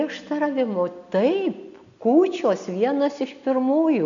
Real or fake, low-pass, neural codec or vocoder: real; 7.2 kHz; none